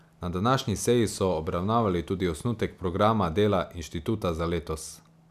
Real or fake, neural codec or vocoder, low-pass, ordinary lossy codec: real; none; 14.4 kHz; none